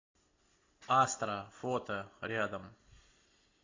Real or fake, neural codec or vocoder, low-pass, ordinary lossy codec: real; none; 7.2 kHz; AAC, 32 kbps